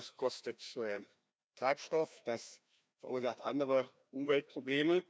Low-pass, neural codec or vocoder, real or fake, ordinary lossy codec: none; codec, 16 kHz, 1 kbps, FreqCodec, larger model; fake; none